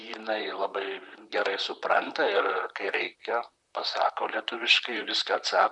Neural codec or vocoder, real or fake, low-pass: vocoder, 44.1 kHz, 128 mel bands, Pupu-Vocoder; fake; 10.8 kHz